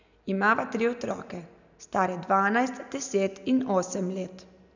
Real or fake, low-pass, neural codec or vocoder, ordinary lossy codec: real; 7.2 kHz; none; none